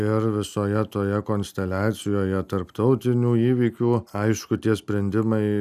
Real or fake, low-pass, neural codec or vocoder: real; 14.4 kHz; none